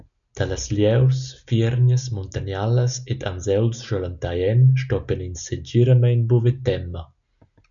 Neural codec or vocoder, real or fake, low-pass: none; real; 7.2 kHz